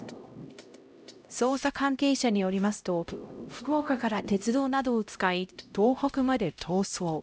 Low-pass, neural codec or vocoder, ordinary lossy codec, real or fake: none; codec, 16 kHz, 0.5 kbps, X-Codec, HuBERT features, trained on LibriSpeech; none; fake